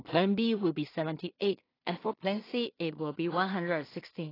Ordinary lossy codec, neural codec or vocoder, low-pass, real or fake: AAC, 24 kbps; codec, 16 kHz in and 24 kHz out, 0.4 kbps, LongCat-Audio-Codec, two codebook decoder; 5.4 kHz; fake